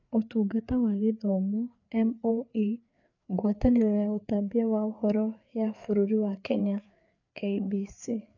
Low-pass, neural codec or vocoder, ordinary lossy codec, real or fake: 7.2 kHz; codec, 16 kHz, 4 kbps, FreqCodec, larger model; none; fake